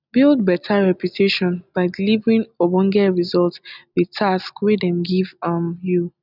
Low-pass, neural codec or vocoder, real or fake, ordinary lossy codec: 5.4 kHz; none; real; none